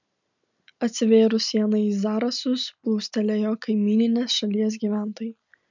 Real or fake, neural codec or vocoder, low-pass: real; none; 7.2 kHz